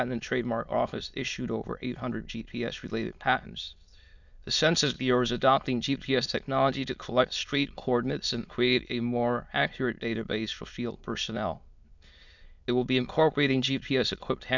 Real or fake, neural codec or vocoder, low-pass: fake; autoencoder, 22.05 kHz, a latent of 192 numbers a frame, VITS, trained on many speakers; 7.2 kHz